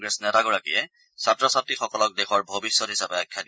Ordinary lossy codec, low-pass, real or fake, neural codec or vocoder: none; none; real; none